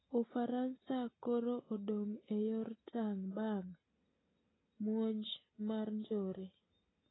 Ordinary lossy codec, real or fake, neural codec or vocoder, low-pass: AAC, 16 kbps; real; none; 7.2 kHz